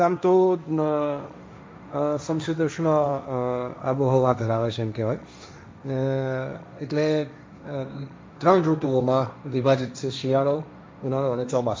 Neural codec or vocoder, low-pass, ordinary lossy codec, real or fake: codec, 16 kHz, 1.1 kbps, Voila-Tokenizer; none; none; fake